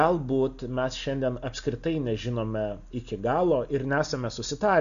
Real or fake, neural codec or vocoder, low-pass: real; none; 7.2 kHz